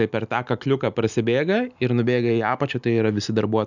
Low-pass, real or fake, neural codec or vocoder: 7.2 kHz; real; none